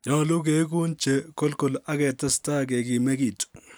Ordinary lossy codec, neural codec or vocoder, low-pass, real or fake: none; none; none; real